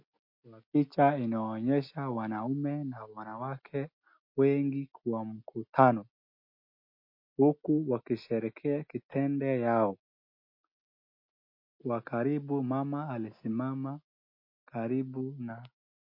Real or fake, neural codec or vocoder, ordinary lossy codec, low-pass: real; none; MP3, 32 kbps; 5.4 kHz